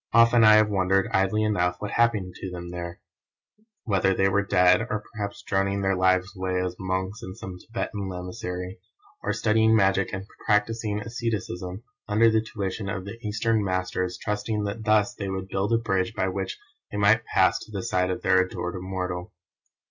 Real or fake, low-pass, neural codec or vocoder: real; 7.2 kHz; none